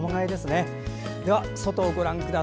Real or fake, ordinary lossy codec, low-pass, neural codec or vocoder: real; none; none; none